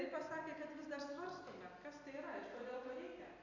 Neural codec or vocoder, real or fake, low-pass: none; real; 7.2 kHz